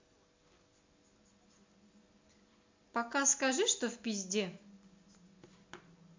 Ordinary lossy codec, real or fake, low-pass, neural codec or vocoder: MP3, 48 kbps; real; 7.2 kHz; none